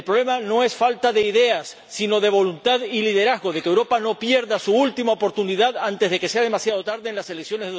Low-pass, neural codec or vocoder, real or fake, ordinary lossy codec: none; none; real; none